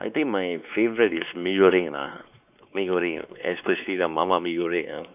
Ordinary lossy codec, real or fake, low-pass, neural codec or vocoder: none; fake; 3.6 kHz; codec, 16 kHz, 4 kbps, X-Codec, WavLM features, trained on Multilingual LibriSpeech